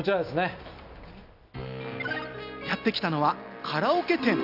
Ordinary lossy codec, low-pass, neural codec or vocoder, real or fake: none; 5.4 kHz; none; real